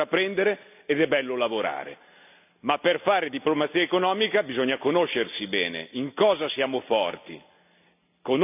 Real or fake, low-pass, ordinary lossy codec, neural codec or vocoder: real; 3.6 kHz; none; none